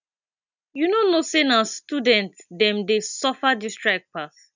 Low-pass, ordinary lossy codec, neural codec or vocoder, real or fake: 7.2 kHz; none; none; real